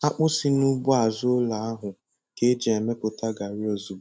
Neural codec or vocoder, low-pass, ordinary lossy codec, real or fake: none; none; none; real